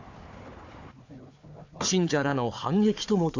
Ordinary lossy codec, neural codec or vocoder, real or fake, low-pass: AAC, 48 kbps; codec, 16 kHz, 4 kbps, FunCodec, trained on Chinese and English, 50 frames a second; fake; 7.2 kHz